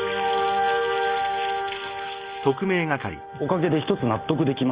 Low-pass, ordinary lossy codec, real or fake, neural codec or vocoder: 3.6 kHz; Opus, 24 kbps; real; none